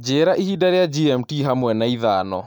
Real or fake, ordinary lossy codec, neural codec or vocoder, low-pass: real; none; none; 19.8 kHz